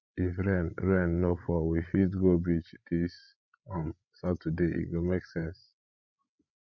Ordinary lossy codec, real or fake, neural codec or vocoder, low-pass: none; fake; codec, 16 kHz, 16 kbps, FreqCodec, larger model; 7.2 kHz